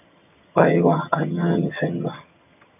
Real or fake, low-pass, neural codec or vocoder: fake; 3.6 kHz; vocoder, 22.05 kHz, 80 mel bands, HiFi-GAN